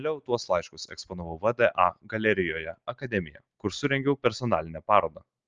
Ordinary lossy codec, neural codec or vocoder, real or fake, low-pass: Opus, 24 kbps; none; real; 7.2 kHz